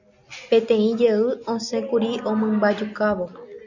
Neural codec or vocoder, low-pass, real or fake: none; 7.2 kHz; real